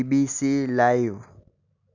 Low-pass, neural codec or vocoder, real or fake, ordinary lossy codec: 7.2 kHz; none; real; none